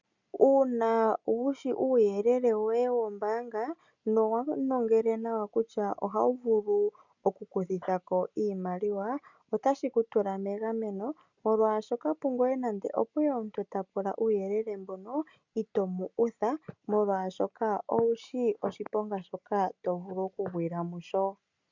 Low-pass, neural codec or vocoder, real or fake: 7.2 kHz; none; real